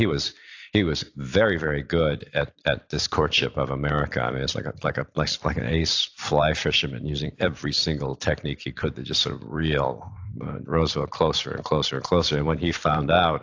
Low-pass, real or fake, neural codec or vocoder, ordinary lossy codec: 7.2 kHz; fake; vocoder, 44.1 kHz, 128 mel bands every 256 samples, BigVGAN v2; AAC, 48 kbps